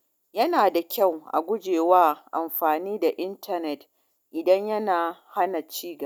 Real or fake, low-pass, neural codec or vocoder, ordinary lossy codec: real; none; none; none